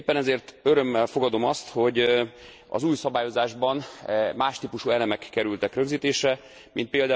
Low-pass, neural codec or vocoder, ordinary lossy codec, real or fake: none; none; none; real